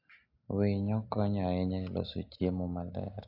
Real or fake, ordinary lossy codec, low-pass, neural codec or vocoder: real; AAC, 32 kbps; 5.4 kHz; none